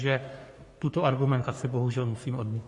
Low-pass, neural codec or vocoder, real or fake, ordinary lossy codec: 10.8 kHz; codec, 44.1 kHz, 3.4 kbps, Pupu-Codec; fake; MP3, 48 kbps